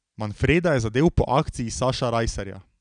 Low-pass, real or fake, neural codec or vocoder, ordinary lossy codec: 9.9 kHz; real; none; none